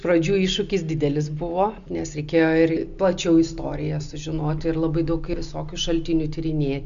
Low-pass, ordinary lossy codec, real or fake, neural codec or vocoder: 7.2 kHz; AAC, 96 kbps; real; none